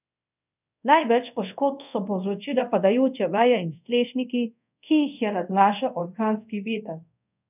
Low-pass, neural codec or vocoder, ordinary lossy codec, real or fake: 3.6 kHz; codec, 24 kHz, 0.5 kbps, DualCodec; none; fake